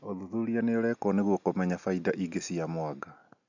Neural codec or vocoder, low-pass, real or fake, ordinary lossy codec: none; 7.2 kHz; real; none